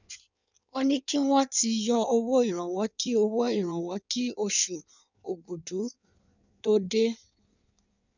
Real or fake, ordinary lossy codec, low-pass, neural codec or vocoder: fake; none; 7.2 kHz; codec, 16 kHz in and 24 kHz out, 1.1 kbps, FireRedTTS-2 codec